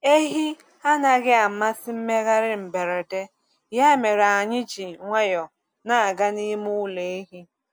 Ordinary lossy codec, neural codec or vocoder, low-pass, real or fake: none; none; none; real